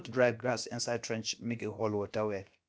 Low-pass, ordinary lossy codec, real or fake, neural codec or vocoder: none; none; fake; codec, 16 kHz, about 1 kbps, DyCAST, with the encoder's durations